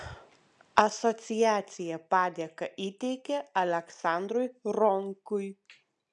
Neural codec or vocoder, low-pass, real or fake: none; 10.8 kHz; real